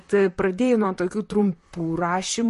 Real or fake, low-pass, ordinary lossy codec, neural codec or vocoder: fake; 14.4 kHz; MP3, 48 kbps; vocoder, 44.1 kHz, 128 mel bands, Pupu-Vocoder